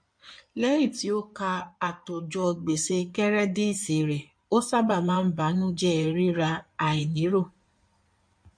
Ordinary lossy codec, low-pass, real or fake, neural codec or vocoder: MP3, 48 kbps; 9.9 kHz; fake; codec, 16 kHz in and 24 kHz out, 2.2 kbps, FireRedTTS-2 codec